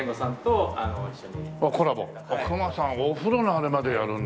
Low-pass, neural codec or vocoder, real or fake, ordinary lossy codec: none; none; real; none